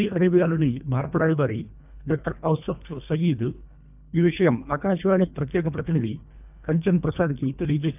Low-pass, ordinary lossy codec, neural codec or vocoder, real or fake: 3.6 kHz; none; codec, 24 kHz, 1.5 kbps, HILCodec; fake